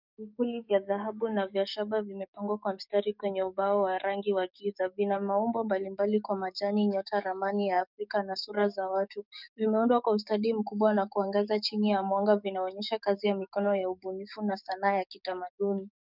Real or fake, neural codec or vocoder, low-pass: fake; codec, 44.1 kHz, 7.8 kbps, DAC; 5.4 kHz